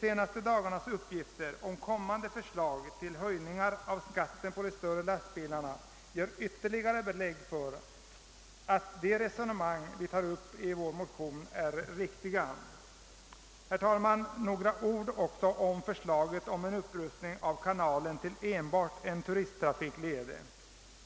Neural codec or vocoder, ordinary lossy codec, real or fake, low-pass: none; none; real; none